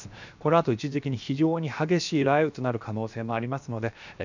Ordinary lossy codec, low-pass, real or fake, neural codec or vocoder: none; 7.2 kHz; fake; codec, 16 kHz, 0.7 kbps, FocalCodec